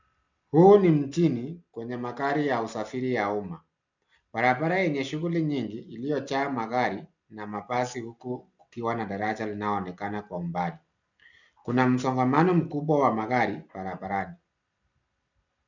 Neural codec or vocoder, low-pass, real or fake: none; 7.2 kHz; real